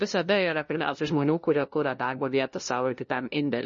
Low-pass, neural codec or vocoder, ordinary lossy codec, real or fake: 7.2 kHz; codec, 16 kHz, 0.5 kbps, FunCodec, trained on LibriTTS, 25 frames a second; MP3, 32 kbps; fake